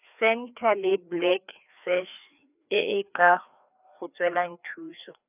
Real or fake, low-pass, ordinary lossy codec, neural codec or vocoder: fake; 3.6 kHz; none; codec, 16 kHz, 2 kbps, FreqCodec, larger model